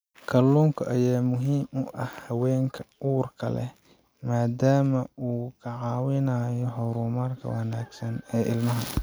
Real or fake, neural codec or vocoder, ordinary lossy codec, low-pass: real; none; none; none